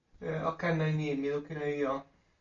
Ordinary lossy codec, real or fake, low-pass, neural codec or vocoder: AAC, 64 kbps; real; 7.2 kHz; none